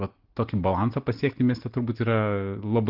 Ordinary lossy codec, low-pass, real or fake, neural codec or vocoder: Opus, 16 kbps; 5.4 kHz; real; none